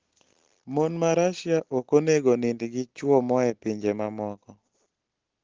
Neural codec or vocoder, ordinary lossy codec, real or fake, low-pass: none; Opus, 16 kbps; real; 7.2 kHz